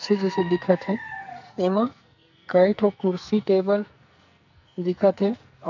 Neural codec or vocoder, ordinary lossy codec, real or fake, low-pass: codec, 44.1 kHz, 2.6 kbps, SNAC; none; fake; 7.2 kHz